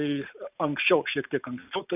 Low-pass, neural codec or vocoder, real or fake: 3.6 kHz; none; real